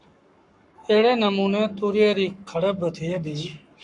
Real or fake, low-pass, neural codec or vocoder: fake; 10.8 kHz; codec, 44.1 kHz, 7.8 kbps, Pupu-Codec